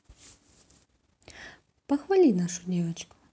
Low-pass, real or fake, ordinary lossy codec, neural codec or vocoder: none; real; none; none